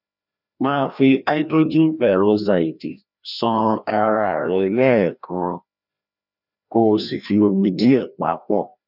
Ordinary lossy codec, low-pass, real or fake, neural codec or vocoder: none; 5.4 kHz; fake; codec, 16 kHz, 1 kbps, FreqCodec, larger model